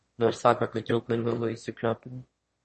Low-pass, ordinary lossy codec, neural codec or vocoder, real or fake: 9.9 kHz; MP3, 32 kbps; autoencoder, 22.05 kHz, a latent of 192 numbers a frame, VITS, trained on one speaker; fake